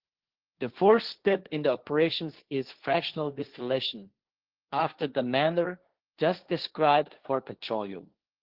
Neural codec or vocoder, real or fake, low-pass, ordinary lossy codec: codec, 16 kHz, 1.1 kbps, Voila-Tokenizer; fake; 5.4 kHz; Opus, 16 kbps